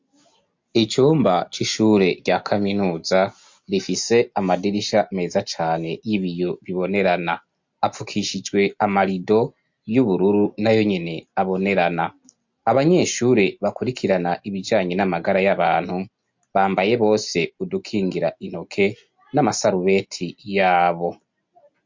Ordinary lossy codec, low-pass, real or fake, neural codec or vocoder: MP3, 48 kbps; 7.2 kHz; real; none